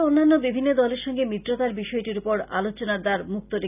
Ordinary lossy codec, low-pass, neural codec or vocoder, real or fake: none; 3.6 kHz; none; real